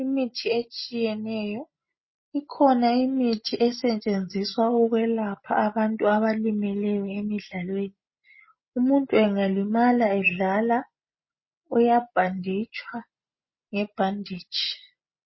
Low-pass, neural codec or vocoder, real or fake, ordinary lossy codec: 7.2 kHz; none; real; MP3, 24 kbps